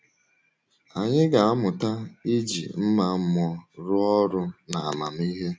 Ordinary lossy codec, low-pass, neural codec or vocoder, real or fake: none; none; none; real